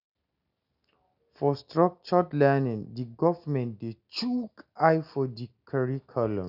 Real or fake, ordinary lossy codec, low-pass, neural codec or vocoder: real; none; 5.4 kHz; none